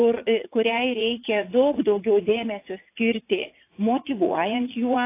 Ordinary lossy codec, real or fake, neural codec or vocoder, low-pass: AAC, 24 kbps; fake; vocoder, 44.1 kHz, 80 mel bands, Vocos; 3.6 kHz